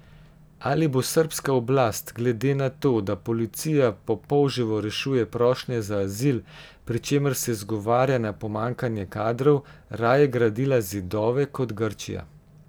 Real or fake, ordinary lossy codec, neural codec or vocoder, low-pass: real; none; none; none